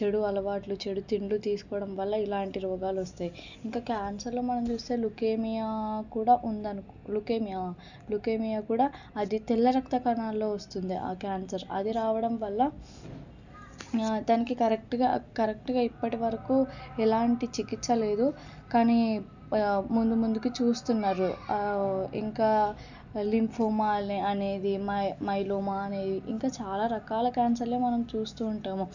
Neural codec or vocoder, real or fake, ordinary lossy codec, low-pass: none; real; none; 7.2 kHz